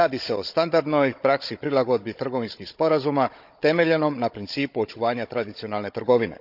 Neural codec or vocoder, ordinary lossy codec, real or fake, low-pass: codec, 16 kHz, 16 kbps, FunCodec, trained on Chinese and English, 50 frames a second; none; fake; 5.4 kHz